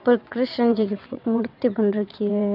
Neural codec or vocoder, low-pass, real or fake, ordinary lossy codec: vocoder, 22.05 kHz, 80 mel bands, WaveNeXt; 5.4 kHz; fake; none